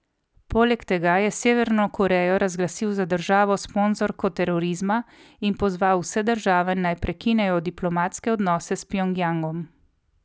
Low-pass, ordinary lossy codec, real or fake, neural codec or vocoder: none; none; real; none